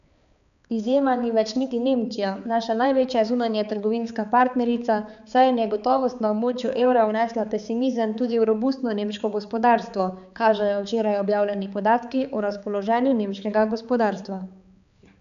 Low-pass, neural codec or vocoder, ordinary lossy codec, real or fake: 7.2 kHz; codec, 16 kHz, 4 kbps, X-Codec, HuBERT features, trained on balanced general audio; none; fake